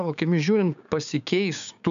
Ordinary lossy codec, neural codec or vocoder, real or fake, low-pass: AAC, 96 kbps; codec, 16 kHz, 6 kbps, DAC; fake; 7.2 kHz